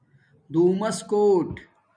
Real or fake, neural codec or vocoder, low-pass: real; none; 9.9 kHz